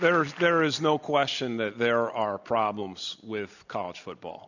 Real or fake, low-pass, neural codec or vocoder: real; 7.2 kHz; none